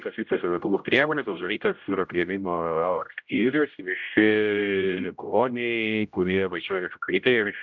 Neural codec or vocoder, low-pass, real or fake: codec, 16 kHz, 0.5 kbps, X-Codec, HuBERT features, trained on general audio; 7.2 kHz; fake